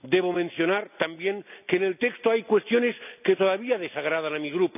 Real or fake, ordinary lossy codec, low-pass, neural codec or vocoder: real; none; 3.6 kHz; none